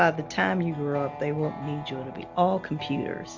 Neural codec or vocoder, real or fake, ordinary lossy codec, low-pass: none; real; Opus, 64 kbps; 7.2 kHz